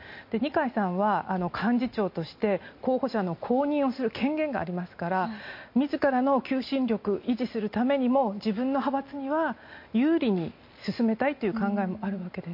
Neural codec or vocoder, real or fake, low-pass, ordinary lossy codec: none; real; 5.4 kHz; MP3, 32 kbps